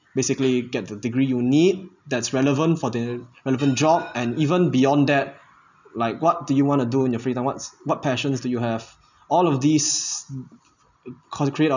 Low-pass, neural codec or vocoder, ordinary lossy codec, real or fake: 7.2 kHz; none; none; real